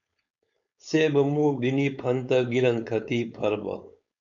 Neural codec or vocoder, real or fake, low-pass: codec, 16 kHz, 4.8 kbps, FACodec; fake; 7.2 kHz